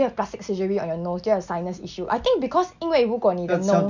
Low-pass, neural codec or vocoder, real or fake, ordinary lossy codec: 7.2 kHz; none; real; none